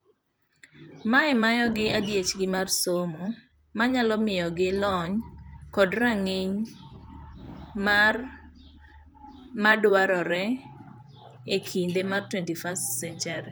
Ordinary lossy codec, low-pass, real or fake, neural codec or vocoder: none; none; fake; vocoder, 44.1 kHz, 128 mel bands, Pupu-Vocoder